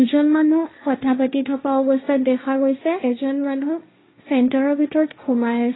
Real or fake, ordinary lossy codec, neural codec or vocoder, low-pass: fake; AAC, 16 kbps; codec, 16 kHz, 1.1 kbps, Voila-Tokenizer; 7.2 kHz